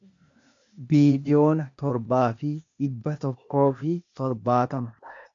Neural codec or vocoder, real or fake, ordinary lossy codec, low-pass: codec, 16 kHz, 0.8 kbps, ZipCodec; fake; AAC, 64 kbps; 7.2 kHz